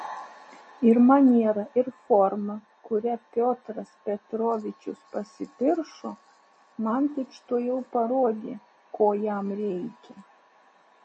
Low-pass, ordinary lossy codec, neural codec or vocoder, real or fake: 10.8 kHz; MP3, 32 kbps; none; real